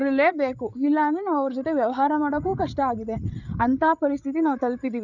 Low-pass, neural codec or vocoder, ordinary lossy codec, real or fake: 7.2 kHz; codec, 16 kHz, 8 kbps, FreqCodec, larger model; none; fake